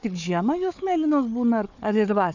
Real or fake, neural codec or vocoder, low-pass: fake; codec, 16 kHz, 4 kbps, FunCodec, trained on Chinese and English, 50 frames a second; 7.2 kHz